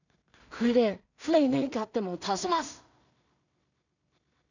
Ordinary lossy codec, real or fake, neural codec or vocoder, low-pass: none; fake; codec, 16 kHz in and 24 kHz out, 0.4 kbps, LongCat-Audio-Codec, two codebook decoder; 7.2 kHz